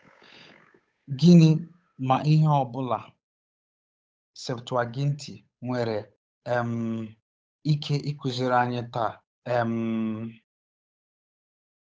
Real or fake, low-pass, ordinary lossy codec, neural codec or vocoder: fake; none; none; codec, 16 kHz, 8 kbps, FunCodec, trained on Chinese and English, 25 frames a second